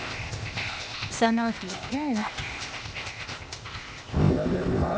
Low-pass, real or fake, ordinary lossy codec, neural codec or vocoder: none; fake; none; codec, 16 kHz, 0.8 kbps, ZipCodec